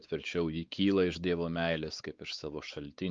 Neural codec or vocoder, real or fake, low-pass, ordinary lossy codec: codec, 16 kHz, 4 kbps, X-Codec, WavLM features, trained on Multilingual LibriSpeech; fake; 7.2 kHz; Opus, 24 kbps